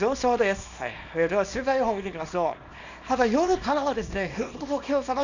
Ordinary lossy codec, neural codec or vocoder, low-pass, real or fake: none; codec, 24 kHz, 0.9 kbps, WavTokenizer, small release; 7.2 kHz; fake